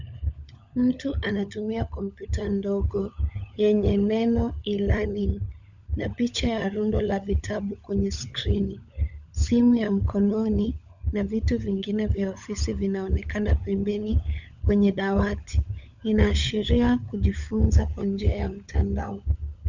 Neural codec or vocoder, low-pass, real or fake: codec, 16 kHz, 16 kbps, FunCodec, trained on LibriTTS, 50 frames a second; 7.2 kHz; fake